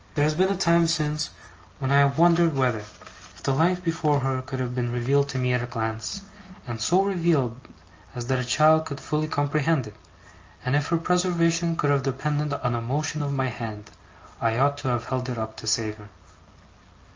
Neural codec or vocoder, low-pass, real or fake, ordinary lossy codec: none; 7.2 kHz; real; Opus, 24 kbps